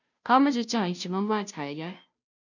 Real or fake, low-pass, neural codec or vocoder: fake; 7.2 kHz; codec, 16 kHz, 0.5 kbps, FunCodec, trained on Chinese and English, 25 frames a second